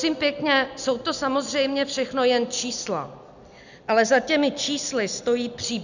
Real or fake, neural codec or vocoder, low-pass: real; none; 7.2 kHz